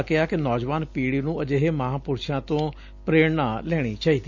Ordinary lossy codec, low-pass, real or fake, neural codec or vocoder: none; 7.2 kHz; real; none